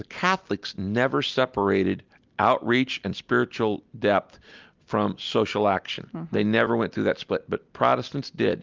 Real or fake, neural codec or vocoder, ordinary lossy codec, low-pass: real; none; Opus, 32 kbps; 7.2 kHz